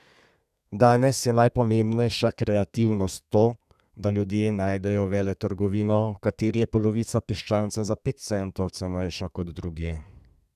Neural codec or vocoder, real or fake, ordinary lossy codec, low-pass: codec, 32 kHz, 1.9 kbps, SNAC; fake; none; 14.4 kHz